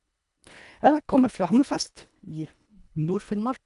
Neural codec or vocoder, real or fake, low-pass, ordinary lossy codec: codec, 24 kHz, 1.5 kbps, HILCodec; fake; 10.8 kHz; none